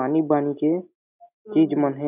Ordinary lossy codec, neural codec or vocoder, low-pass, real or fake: none; none; 3.6 kHz; real